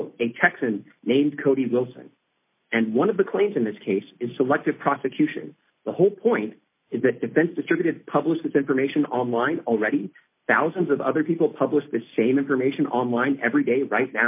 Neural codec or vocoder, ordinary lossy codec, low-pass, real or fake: none; MP3, 24 kbps; 3.6 kHz; real